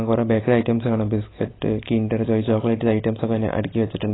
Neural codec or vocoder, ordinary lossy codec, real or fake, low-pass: none; AAC, 16 kbps; real; 7.2 kHz